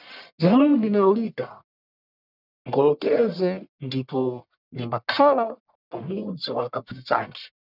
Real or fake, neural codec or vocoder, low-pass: fake; codec, 44.1 kHz, 1.7 kbps, Pupu-Codec; 5.4 kHz